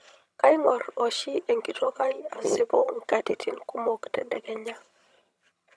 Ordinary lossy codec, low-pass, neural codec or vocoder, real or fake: none; none; vocoder, 22.05 kHz, 80 mel bands, HiFi-GAN; fake